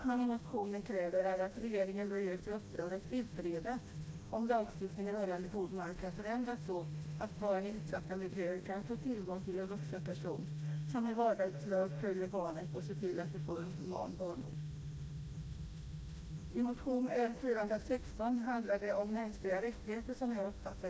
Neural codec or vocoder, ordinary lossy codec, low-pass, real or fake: codec, 16 kHz, 1 kbps, FreqCodec, smaller model; none; none; fake